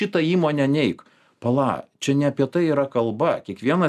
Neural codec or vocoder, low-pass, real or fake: none; 14.4 kHz; real